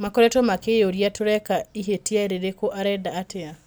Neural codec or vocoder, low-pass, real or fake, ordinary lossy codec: none; none; real; none